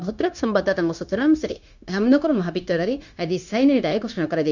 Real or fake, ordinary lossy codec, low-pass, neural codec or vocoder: fake; none; 7.2 kHz; codec, 16 kHz, 0.9 kbps, LongCat-Audio-Codec